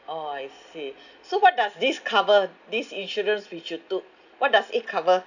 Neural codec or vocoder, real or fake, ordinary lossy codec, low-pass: none; real; none; 7.2 kHz